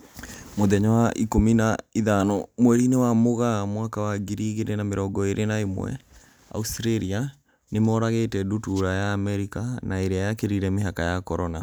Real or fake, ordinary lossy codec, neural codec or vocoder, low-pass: real; none; none; none